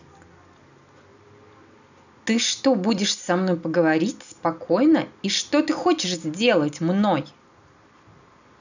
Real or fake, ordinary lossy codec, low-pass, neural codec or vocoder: real; none; 7.2 kHz; none